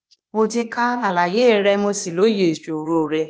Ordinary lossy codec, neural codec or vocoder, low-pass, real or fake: none; codec, 16 kHz, 0.8 kbps, ZipCodec; none; fake